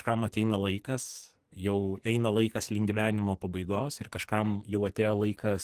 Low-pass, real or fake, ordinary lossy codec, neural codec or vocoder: 14.4 kHz; fake; Opus, 24 kbps; codec, 44.1 kHz, 2.6 kbps, SNAC